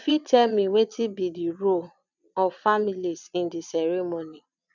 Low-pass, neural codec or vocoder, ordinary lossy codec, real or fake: 7.2 kHz; vocoder, 22.05 kHz, 80 mel bands, Vocos; none; fake